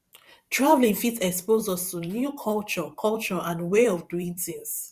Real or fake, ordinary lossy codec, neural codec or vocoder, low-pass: fake; Opus, 64 kbps; vocoder, 44.1 kHz, 128 mel bands every 512 samples, BigVGAN v2; 14.4 kHz